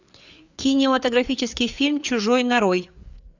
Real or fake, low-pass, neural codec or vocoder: fake; 7.2 kHz; codec, 16 kHz, 4 kbps, FreqCodec, larger model